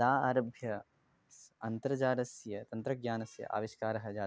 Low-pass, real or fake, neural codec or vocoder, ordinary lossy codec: none; real; none; none